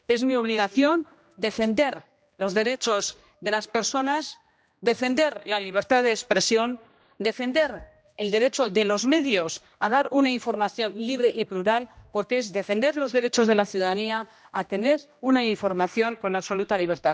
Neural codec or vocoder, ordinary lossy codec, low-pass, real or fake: codec, 16 kHz, 1 kbps, X-Codec, HuBERT features, trained on general audio; none; none; fake